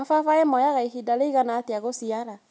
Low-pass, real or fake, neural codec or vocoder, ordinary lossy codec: none; real; none; none